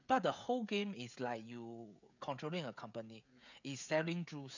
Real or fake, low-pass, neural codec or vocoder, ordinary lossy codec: fake; 7.2 kHz; codec, 16 kHz, 16 kbps, FreqCodec, smaller model; none